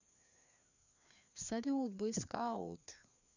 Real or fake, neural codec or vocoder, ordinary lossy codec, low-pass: fake; codec, 16 kHz, 2 kbps, FreqCodec, larger model; none; 7.2 kHz